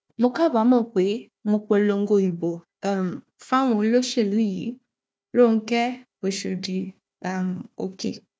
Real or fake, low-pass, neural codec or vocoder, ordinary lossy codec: fake; none; codec, 16 kHz, 1 kbps, FunCodec, trained on Chinese and English, 50 frames a second; none